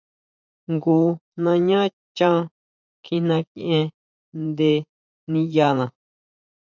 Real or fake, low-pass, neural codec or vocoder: fake; 7.2 kHz; vocoder, 44.1 kHz, 80 mel bands, Vocos